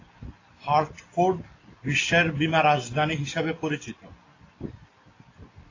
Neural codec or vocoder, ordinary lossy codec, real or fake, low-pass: none; AAC, 32 kbps; real; 7.2 kHz